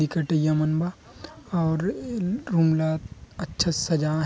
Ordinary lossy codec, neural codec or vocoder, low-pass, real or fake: none; none; none; real